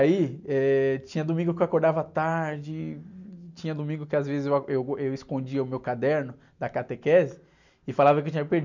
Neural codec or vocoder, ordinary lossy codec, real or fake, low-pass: none; none; real; 7.2 kHz